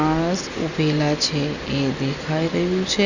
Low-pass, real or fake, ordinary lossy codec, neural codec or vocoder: 7.2 kHz; real; none; none